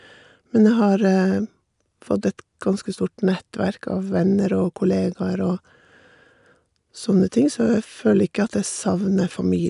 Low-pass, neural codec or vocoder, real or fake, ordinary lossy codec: 10.8 kHz; none; real; none